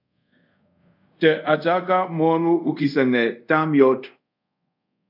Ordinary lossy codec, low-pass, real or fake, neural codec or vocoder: AAC, 48 kbps; 5.4 kHz; fake; codec, 24 kHz, 0.5 kbps, DualCodec